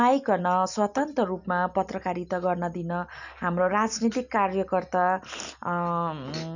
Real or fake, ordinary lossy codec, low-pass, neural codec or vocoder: real; none; 7.2 kHz; none